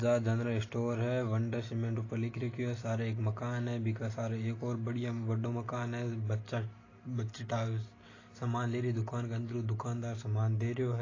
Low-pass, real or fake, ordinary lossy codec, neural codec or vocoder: 7.2 kHz; real; AAC, 32 kbps; none